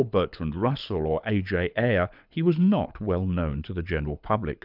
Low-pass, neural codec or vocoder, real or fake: 5.4 kHz; codec, 24 kHz, 6 kbps, HILCodec; fake